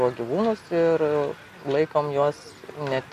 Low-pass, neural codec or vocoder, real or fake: 14.4 kHz; none; real